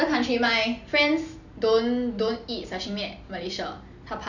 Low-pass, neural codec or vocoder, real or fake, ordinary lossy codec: 7.2 kHz; none; real; none